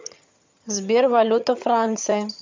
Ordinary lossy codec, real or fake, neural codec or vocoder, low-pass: MP3, 64 kbps; fake; vocoder, 22.05 kHz, 80 mel bands, HiFi-GAN; 7.2 kHz